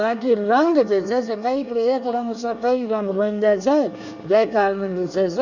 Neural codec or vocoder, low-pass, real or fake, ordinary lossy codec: codec, 24 kHz, 1 kbps, SNAC; 7.2 kHz; fake; none